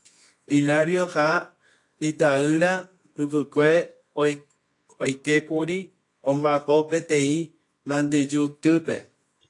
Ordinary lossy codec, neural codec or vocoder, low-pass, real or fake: AAC, 48 kbps; codec, 24 kHz, 0.9 kbps, WavTokenizer, medium music audio release; 10.8 kHz; fake